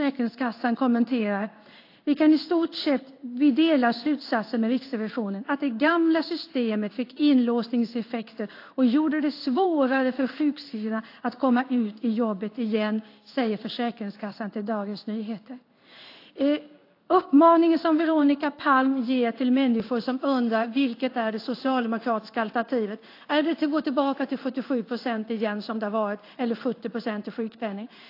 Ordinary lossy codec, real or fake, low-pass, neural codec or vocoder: AAC, 32 kbps; fake; 5.4 kHz; codec, 16 kHz in and 24 kHz out, 1 kbps, XY-Tokenizer